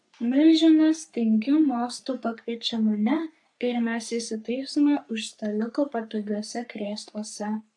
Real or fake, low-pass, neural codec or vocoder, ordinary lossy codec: fake; 10.8 kHz; codec, 44.1 kHz, 3.4 kbps, Pupu-Codec; AAC, 64 kbps